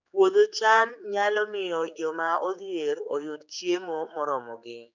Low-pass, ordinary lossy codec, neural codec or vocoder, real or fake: 7.2 kHz; none; codec, 16 kHz, 4 kbps, X-Codec, HuBERT features, trained on general audio; fake